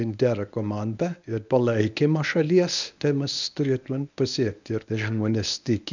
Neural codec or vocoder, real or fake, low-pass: codec, 24 kHz, 0.9 kbps, WavTokenizer, medium speech release version 1; fake; 7.2 kHz